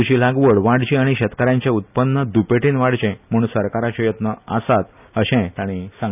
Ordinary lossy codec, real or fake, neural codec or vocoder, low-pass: none; real; none; 3.6 kHz